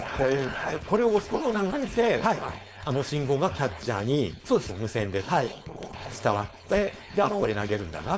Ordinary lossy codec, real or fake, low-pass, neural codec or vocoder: none; fake; none; codec, 16 kHz, 4.8 kbps, FACodec